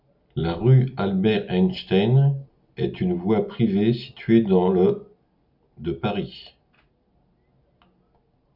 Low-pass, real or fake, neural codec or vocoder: 5.4 kHz; real; none